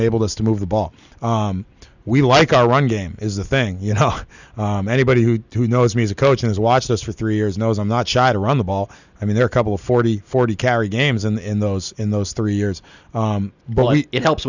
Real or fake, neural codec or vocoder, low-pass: real; none; 7.2 kHz